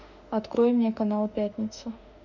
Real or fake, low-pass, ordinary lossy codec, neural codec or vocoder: fake; 7.2 kHz; none; autoencoder, 48 kHz, 32 numbers a frame, DAC-VAE, trained on Japanese speech